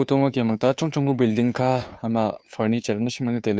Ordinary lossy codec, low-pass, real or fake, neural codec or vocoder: none; none; fake; codec, 16 kHz, 2 kbps, FunCodec, trained on Chinese and English, 25 frames a second